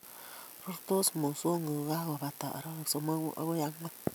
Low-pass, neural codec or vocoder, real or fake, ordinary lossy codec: none; none; real; none